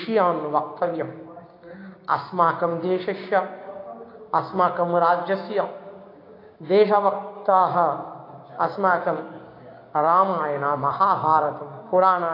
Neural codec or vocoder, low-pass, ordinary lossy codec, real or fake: codec, 16 kHz, 6 kbps, DAC; 5.4 kHz; AAC, 32 kbps; fake